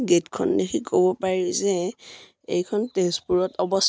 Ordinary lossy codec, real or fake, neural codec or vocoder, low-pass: none; real; none; none